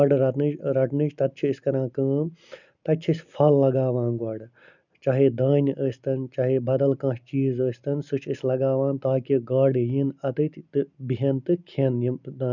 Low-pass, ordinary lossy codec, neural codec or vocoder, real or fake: 7.2 kHz; none; none; real